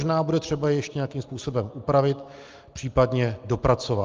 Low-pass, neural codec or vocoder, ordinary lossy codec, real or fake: 7.2 kHz; none; Opus, 32 kbps; real